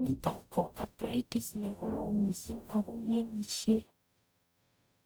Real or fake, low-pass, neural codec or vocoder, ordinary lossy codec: fake; none; codec, 44.1 kHz, 0.9 kbps, DAC; none